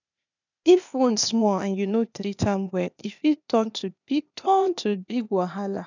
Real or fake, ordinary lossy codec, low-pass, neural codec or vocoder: fake; none; 7.2 kHz; codec, 16 kHz, 0.8 kbps, ZipCodec